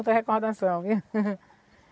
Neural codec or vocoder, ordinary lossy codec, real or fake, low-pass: none; none; real; none